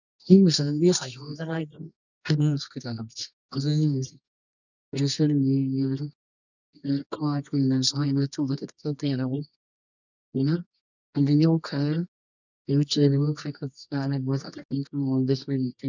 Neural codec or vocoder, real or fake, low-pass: codec, 24 kHz, 0.9 kbps, WavTokenizer, medium music audio release; fake; 7.2 kHz